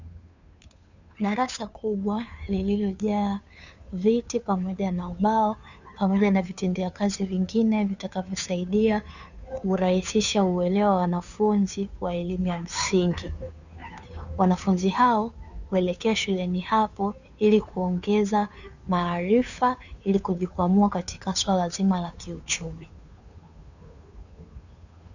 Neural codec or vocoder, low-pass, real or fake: codec, 16 kHz, 2 kbps, FunCodec, trained on Chinese and English, 25 frames a second; 7.2 kHz; fake